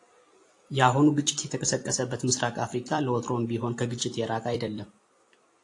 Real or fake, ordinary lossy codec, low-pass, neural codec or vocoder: fake; AAC, 48 kbps; 10.8 kHz; vocoder, 44.1 kHz, 128 mel bands every 256 samples, BigVGAN v2